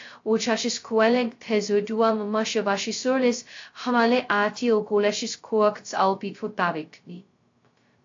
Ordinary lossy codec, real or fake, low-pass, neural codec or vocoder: AAC, 48 kbps; fake; 7.2 kHz; codec, 16 kHz, 0.2 kbps, FocalCodec